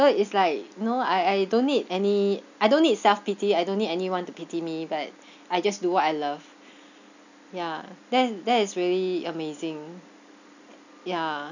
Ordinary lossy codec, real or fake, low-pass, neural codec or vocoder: none; real; 7.2 kHz; none